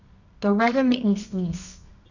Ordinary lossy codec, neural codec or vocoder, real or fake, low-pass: none; codec, 24 kHz, 0.9 kbps, WavTokenizer, medium music audio release; fake; 7.2 kHz